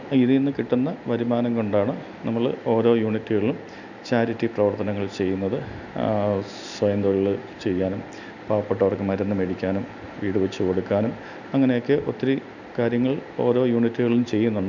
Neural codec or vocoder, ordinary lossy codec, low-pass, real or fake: none; none; 7.2 kHz; real